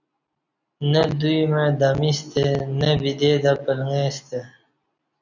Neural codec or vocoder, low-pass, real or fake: none; 7.2 kHz; real